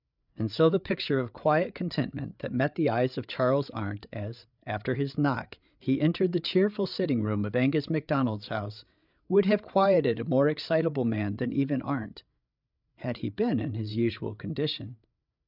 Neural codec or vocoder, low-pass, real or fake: codec, 16 kHz, 8 kbps, FreqCodec, larger model; 5.4 kHz; fake